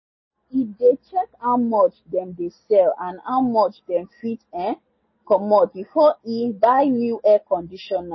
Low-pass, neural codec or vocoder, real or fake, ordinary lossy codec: 7.2 kHz; none; real; MP3, 24 kbps